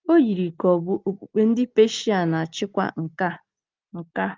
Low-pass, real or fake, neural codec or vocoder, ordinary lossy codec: 7.2 kHz; real; none; Opus, 24 kbps